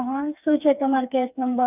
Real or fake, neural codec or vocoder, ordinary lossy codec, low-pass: fake; codec, 16 kHz, 4 kbps, FreqCodec, smaller model; none; 3.6 kHz